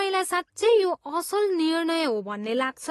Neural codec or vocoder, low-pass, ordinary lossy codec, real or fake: none; 19.8 kHz; AAC, 32 kbps; real